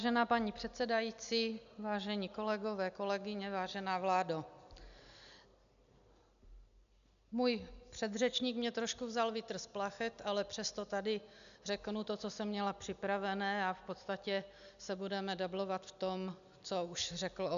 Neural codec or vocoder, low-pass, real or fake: none; 7.2 kHz; real